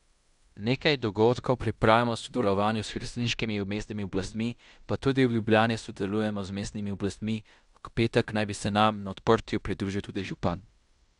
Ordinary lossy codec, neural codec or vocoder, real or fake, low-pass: none; codec, 16 kHz in and 24 kHz out, 0.9 kbps, LongCat-Audio-Codec, fine tuned four codebook decoder; fake; 10.8 kHz